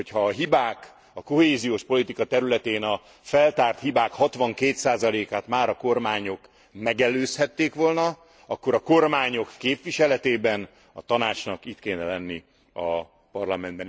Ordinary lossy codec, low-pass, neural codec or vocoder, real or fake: none; none; none; real